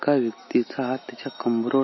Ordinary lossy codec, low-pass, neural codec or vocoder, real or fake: MP3, 24 kbps; 7.2 kHz; none; real